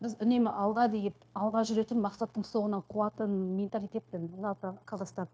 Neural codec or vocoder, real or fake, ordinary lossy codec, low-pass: codec, 16 kHz, 0.9 kbps, LongCat-Audio-Codec; fake; none; none